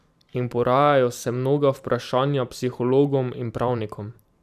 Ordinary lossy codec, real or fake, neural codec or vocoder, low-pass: none; fake; vocoder, 44.1 kHz, 128 mel bands every 512 samples, BigVGAN v2; 14.4 kHz